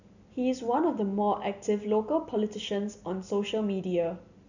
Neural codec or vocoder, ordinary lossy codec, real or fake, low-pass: none; none; real; 7.2 kHz